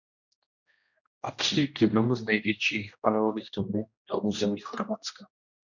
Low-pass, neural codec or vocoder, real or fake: 7.2 kHz; codec, 16 kHz, 1 kbps, X-Codec, HuBERT features, trained on general audio; fake